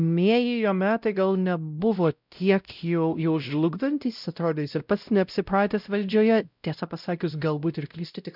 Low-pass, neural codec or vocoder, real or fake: 5.4 kHz; codec, 16 kHz, 0.5 kbps, X-Codec, WavLM features, trained on Multilingual LibriSpeech; fake